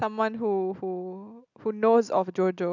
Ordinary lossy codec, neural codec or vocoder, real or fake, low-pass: none; none; real; 7.2 kHz